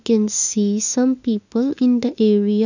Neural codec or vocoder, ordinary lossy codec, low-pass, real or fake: autoencoder, 48 kHz, 32 numbers a frame, DAC-VAE, trained on Japanese speech; none; 7.2 kHz; fake